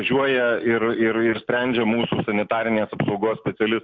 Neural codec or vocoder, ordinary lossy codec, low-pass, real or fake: none; Opus, 64 kbps; 7.2 kHz; real